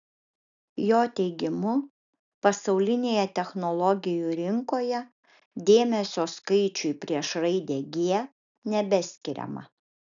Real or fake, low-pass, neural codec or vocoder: real; 7.2 kHz; none